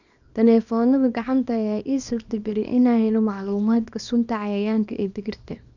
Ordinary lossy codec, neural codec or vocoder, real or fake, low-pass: none; codec, 24 kHz, 0.9 kbps, WavTokenizer, small release; fake; 7.2 kHz